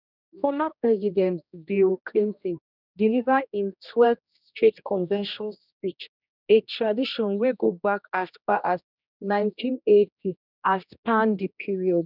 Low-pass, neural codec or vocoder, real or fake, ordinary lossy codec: 5.4 kHz; codec, 16 kHz, 1 kbps, X-Codec, HuBERT features, trained on general audio; fake; none